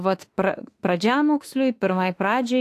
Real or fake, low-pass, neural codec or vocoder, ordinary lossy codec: fake; 14.4 kHz; autoencoder, 48 kHz, 32 numbers a frame, DAC-VAE, trained on Japanese speech; AAC, 64 kbps